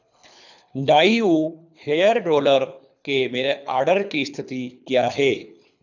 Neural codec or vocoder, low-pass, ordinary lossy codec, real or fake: codec, 24 kHz, 3 kbps, HILCodec; 7.2 kHz; none; fake